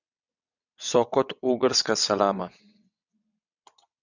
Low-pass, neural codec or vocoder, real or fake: 7.2 kHz; vocoder, 22.05 kHz, 80 mel bands, WaveNeXt; fake